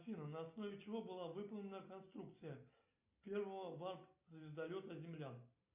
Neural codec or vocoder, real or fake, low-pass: none; real; 3.6 kHz